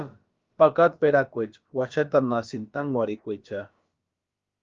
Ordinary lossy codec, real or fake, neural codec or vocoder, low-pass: Opus, 32 kbps; fake; codec, 16 kHz, about 1 kbps, DyCAST, with the encoder's durations; 7.2 kHz